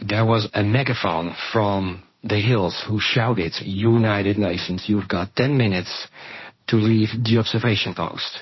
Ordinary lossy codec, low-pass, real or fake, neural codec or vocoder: MP3, 24 kbps; 7.2 kHz; fake; codec, 16 kHz, 1.1 kbps, Voila-Tokenizer